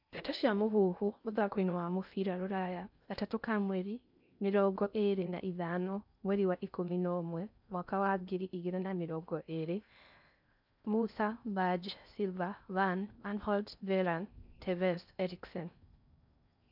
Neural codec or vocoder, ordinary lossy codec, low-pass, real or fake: codec, 16 kHz in and 24 kHz out, 0.6 kbps, FocalCodec, streaming, 2048 codes; none; 5.4 kHz; fake